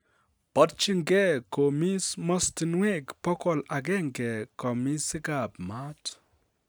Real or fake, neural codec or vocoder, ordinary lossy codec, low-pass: real; none; none; none